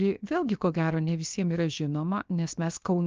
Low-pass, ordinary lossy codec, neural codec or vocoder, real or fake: 7.2 kHz; Opus, 24 kbps; codec, 16 kHz, 0.7 kbps, FocalCodec; fake